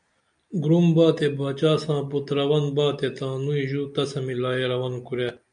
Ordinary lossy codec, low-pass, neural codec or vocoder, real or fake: MP3, 96 kbps; 9.9 kHz; none; real